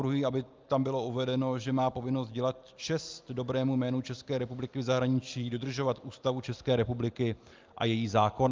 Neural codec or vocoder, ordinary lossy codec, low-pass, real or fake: none; Opus, 32 kbps; 7.2 kHz; real